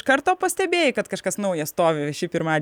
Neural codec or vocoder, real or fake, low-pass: none; real; 19.8 kHz